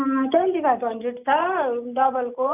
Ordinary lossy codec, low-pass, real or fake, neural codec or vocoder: none; 3.6 kHz; real; none